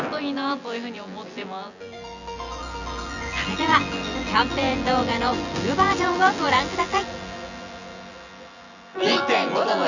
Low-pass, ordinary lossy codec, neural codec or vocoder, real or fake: 7.2 kHz; none; vocoder, 24 kHz, 100 mel bands, Vocos; fake